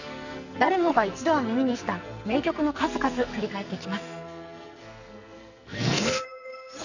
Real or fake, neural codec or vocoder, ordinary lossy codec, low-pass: fake; codec, 44.1 kHz, 2.6 kbps, SNAC; none; 7.2 kHz